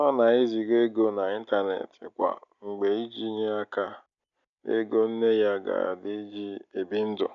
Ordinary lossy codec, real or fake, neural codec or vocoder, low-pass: none; real; none; 7.2 kHz